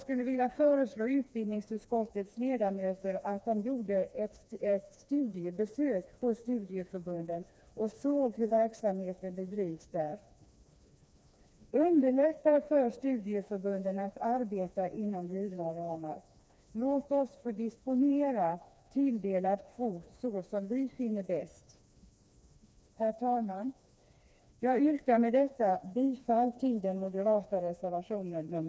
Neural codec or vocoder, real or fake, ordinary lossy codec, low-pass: codec, 16 kHz, 2 kbps, FreqCodec, smaller model; fake; none; none